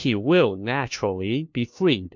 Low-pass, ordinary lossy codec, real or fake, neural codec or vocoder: 7.2 kHz; MP3, 64 kbps; fake; codec, 16 kHz, 1 kbps, FunCodec, trained on LibriTTS, 50 frames a second